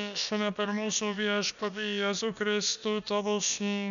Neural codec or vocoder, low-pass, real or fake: codec, 16 kHz, about 1 kbps, DyCAST, with the encoder's durations; 7.2 kHz; fake